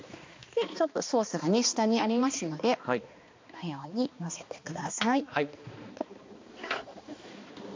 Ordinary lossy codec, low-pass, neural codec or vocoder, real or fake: MP3, 48 kbps; 7.2 kHz; codec, 16 kHz, 2 kbps, X-Codec, HuBERT features, trained on balanced general audio; fake